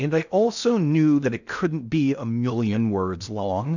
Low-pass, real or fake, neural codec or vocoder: 7.2 kHz; fake; codec, 16 kHz in and 24 kHz out, 0.6 kbps, FocalCodec, streaming, 4096 codes